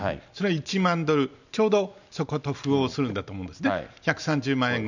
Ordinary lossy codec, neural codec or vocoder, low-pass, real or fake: none; none; 7.2 kHz; real